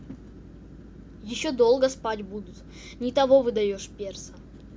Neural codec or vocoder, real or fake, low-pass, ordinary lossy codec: none; real; none; none